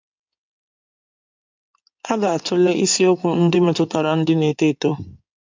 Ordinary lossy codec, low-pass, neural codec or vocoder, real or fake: MP3, 64 kbps; 7.2 kHz; codec, 16 kHz in and 24 kHz out, 2.2 kbps, FireRedTTS-2 codec; fake